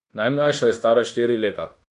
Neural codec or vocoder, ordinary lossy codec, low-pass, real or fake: codec, 16 kHz in and 24 kHz out, 0.9 kbps, LongCat-Audio-Codec, fine tuned four codebook decoder; none; 10.8 kHz; fake